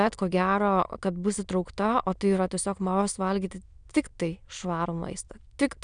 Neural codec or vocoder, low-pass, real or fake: autoencoder, 22.05 kHz, a latent of 192 numbers a frame, VITS, trained on many speakers; 9.9 kHz; fake